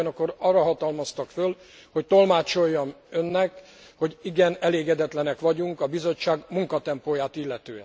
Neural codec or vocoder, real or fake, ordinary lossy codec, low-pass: none; real; none; none